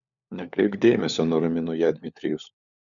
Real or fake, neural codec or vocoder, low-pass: fake; codec, 16 kHz, 4 kbps, FunCodec, trained on LibriTTS, 50 frames a second; 7.2 kHz